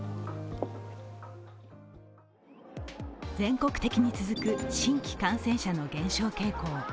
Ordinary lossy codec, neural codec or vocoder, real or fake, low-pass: none; none; real; none